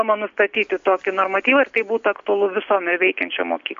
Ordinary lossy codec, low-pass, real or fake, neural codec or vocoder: AAC, 64 kbps; 7.2 kHz; real; none